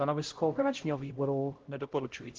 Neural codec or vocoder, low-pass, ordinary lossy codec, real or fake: codec, 16 kHz, 0.5 kbps, X-Codec, HuBERT features, trained on LibriSpeech; 7.2 kHz; Opus, 16 kbps; fake